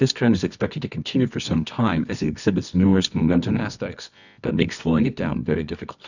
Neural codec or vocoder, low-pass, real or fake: codec, 24 kHz, 0.9 kbps, WavTokenizer, medium music audio release; 7.2 kHz; fake